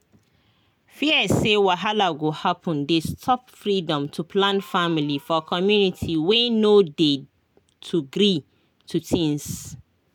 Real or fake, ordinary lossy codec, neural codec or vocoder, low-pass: real; none; none; 19.8 kHz